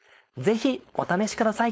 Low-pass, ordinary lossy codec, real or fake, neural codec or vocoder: none; none; fake; codec, 16 kHz, 4.8 kbps, FACodec